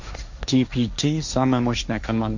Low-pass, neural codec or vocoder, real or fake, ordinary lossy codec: 7.2 kHz; codec, 16 kHz, 1.1 kbps, Voila-Tokenizer; fake; none